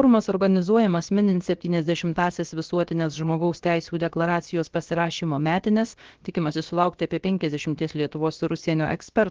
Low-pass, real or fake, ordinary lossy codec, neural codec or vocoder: 7.2 kHz; fake; Opus, 16 kbps; codec, 16 kHz, about 1 kbps, DyCAST, with the encoder's durations